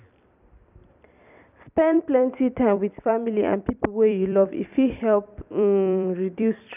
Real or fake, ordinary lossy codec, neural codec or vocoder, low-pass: fake; none; vocoder, 44.1 kHz, 128 mel bands every 256 samples, BigVGAN v2; 3.6 kHz